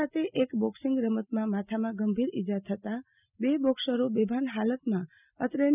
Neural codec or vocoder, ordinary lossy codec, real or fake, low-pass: none; none; real; 3.6 kHz